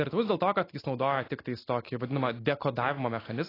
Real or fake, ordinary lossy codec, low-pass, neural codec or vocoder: real; AAC, 24 kbps; 5.4 kHz; none